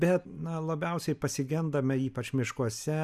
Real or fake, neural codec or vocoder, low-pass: real; none; 14.4 kHz